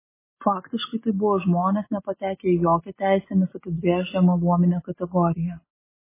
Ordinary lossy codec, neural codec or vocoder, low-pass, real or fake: MP3, 16 kbps; none; 3.6 kHz; real